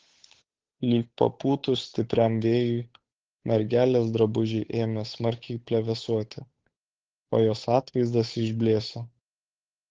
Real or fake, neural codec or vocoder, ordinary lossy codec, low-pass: fake; codec, 16 kHz, 8 kbps, FunCodec, trained on Chinese and English, 25 frames a second; Opus, 16 kbps; 7.2 kHz